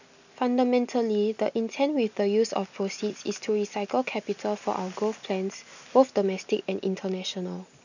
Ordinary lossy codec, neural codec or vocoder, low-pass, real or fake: none; none; 7.2 kHz; real